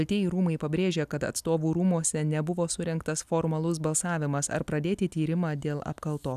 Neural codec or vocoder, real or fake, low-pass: none; real; 14.4 kHz